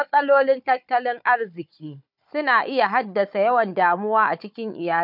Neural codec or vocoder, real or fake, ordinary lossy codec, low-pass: codec, 16 kHz, 4 kbps, FunCodec, trained on Chinese and English, 50 frames a second; fake; none; 5.4 kHz